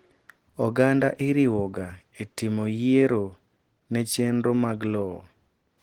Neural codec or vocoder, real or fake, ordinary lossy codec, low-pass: none; real; Opus, 16 kbps; 19.8 kHz